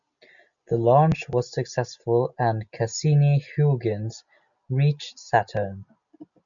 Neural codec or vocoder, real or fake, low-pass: none; real; 7.2 kHz